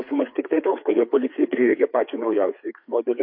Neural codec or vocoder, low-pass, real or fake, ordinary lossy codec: codec, 16 kHz, 4 kbps, FreqCodec, larger model; 5.4 kHz; fake; MP3, 32 kbps